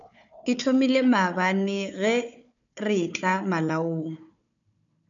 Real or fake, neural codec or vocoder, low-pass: fake; codec, 16 kHz, 4 kbps, FunCodec, trained on Chinese and English, 50 frames a second; 7.2 kHz